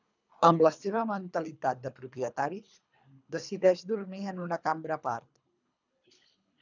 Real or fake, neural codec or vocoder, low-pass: fake; codec, 24 kHz, 3 kbps, HILCodec; 7.2 kHz